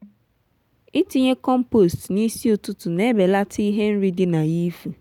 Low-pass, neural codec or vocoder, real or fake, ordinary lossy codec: 19.8 kHz; none; real; none